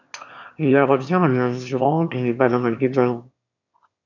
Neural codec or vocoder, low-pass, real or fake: autoencoder, 22.05 kHz, a latent of 192 numbers a frame, VITS, trained on one speaker; 7.2 kHz; fake